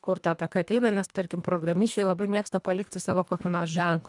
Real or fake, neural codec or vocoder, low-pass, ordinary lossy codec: fake; codec, 24 kHz, 1.5 kbps, HILCodec; 10.8 kHz; MP3, 96 kbps